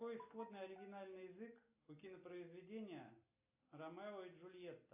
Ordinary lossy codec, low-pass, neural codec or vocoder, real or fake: AAC, 32 kbps; 3.6 kHz; none; real